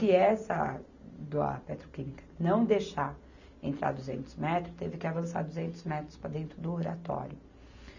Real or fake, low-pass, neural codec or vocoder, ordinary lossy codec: real; 7.2 kHz; none; none